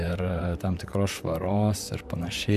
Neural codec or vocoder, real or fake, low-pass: vocoder, 44.1 kHz, 128 mel bands, Pupu-Vocoder; fake; 14.4 kHz